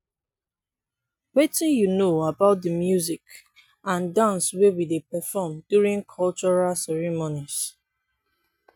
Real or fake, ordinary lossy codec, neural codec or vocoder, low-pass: real; none; none; none